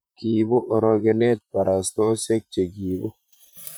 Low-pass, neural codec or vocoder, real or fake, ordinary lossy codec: none; vocoder, 44.1 kHz, 128 mel bands, Pupu-Vocoder; fake; none